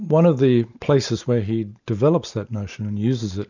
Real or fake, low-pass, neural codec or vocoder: real; 7.2 kHz; none